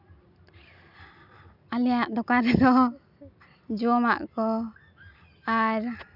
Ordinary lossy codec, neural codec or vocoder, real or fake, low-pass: none; none; real; 5.4 kHz